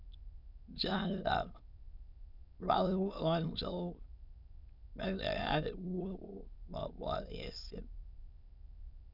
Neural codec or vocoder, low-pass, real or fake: autoencoder, 22.05 kHz, a latent of 192 numbers a frame, VITS, trained on many speakers; 5.4 kHz; fake